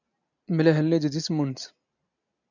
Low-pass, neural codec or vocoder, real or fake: 7.2 kHz; none; real